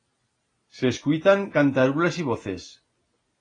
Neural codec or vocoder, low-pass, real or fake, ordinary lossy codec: none; 9.9 kHz; real; AAC, 32 kbps